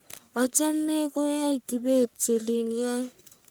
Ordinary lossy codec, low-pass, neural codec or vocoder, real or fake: none; none; codec, 44.1 kHz, 1.7 kbps, Pupu-Codec; fake